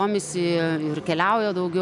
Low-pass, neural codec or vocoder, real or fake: 10.8 kHz; none; real